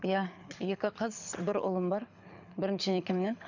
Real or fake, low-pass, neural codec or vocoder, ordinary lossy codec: fake; 7.2 kHz; codec, 24 kHz, 6 kbps, HILCodec; none